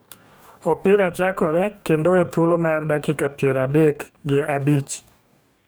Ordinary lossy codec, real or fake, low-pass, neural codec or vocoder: none; fake; none; codec, 44.1 kHz, 2.6 kbps, DAC